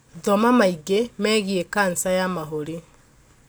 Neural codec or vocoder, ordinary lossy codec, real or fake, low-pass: none; none; real; none